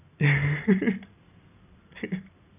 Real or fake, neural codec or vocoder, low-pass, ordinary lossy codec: real; none; 3.6 kHz; none